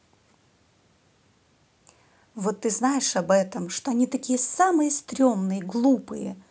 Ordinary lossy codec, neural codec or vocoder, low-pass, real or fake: none; none; none; real